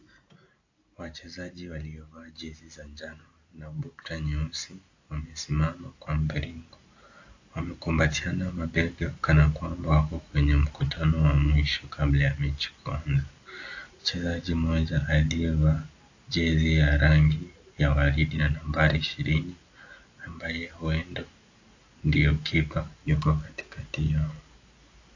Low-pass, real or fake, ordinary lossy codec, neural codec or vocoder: 7.2 kHz; real; AAC, 48 kbps; none